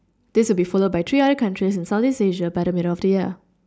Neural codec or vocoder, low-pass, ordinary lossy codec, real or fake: none; none; none; real